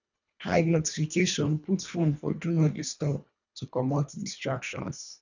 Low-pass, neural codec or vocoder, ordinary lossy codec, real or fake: 7.2 kHz; codec, 24 kHz, 1.5 kbps, HILCodec; none; fake